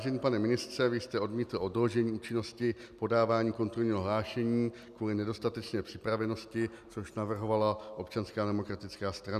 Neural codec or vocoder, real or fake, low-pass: none; real; 14.4 kHz